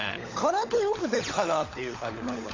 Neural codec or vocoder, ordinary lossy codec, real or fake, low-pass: codec, 16 kHz, 16 kbps, FunCodec, trained on LibriTTS, 50 frames a second; AAC, 32 kbps; fake; 7.2 kHz